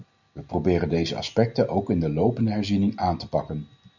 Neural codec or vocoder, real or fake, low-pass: none; real; 7.2 kHz